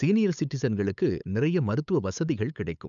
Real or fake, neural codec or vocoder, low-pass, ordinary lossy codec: fake; codec, 16 kHz, 8 kbps, FunCodec, trained on LibriTTS, 25 frames a second; 7.2 kHz; none